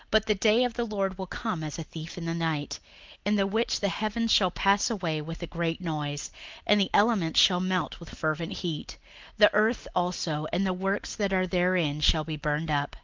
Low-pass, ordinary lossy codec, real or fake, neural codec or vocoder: 7.2 kHz; Opus, 24 kbps; real; none